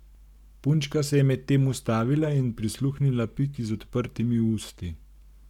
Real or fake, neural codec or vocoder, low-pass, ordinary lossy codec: fake; codec, 44.1 kHz, 7.8 kbps, Pupu-Codec; 19.8 kHz; none